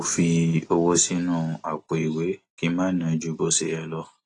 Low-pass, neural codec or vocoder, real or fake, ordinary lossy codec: 10.8 kHz; none; real; AAC, 32 kbps